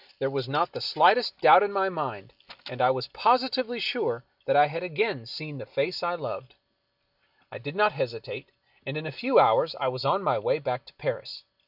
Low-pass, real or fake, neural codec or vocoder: 5.4 kHz; real; none